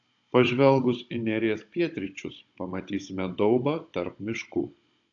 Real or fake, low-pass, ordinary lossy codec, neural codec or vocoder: fake; 7.2 kHz; AAC, 64 kbps; codec, 16 kHz, 16 kbps, FunCodec, trained on Chinese and English, 50 frames a second